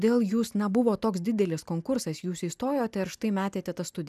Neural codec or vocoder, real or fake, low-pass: none; real; 14.4 kHz